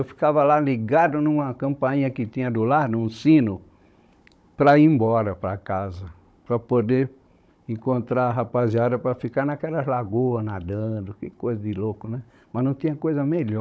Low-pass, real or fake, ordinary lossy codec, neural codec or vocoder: none; fake; none; codec, 16 kHz, 16 kbps, FunCodec, trained on Chinese and English, 50 frames a second